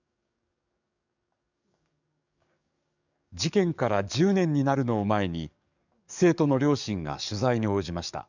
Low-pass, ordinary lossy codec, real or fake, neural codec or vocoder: 7.2 kHz; none; fake; codec, 44.1 kHz, 7.8 kbps, DAC